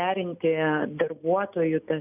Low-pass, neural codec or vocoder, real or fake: 3.6 kHz; none; real